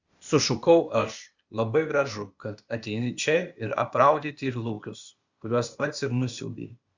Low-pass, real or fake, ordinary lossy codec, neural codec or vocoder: 7.2 kHz; fake; Opus, 64 kbps; codec, 16 kHz, 0.8 kbps, ZipCodec